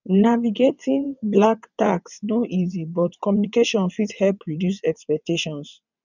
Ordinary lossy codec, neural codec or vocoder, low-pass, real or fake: none; vocoder, 22.05 kHz, 80 mel bands, WaveNeXt; 7.2 kHz; fake